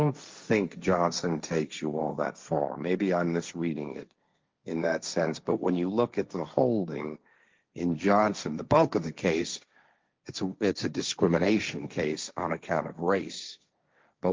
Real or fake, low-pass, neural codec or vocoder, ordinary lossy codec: fake; 7.2 kHz; codec, 16 kHz, 1.1 kbps, Voila-Tokenizer; Opus, 32 kbps